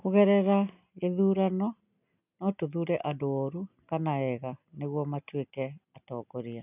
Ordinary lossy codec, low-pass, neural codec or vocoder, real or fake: none; 3.6 kHz; none; real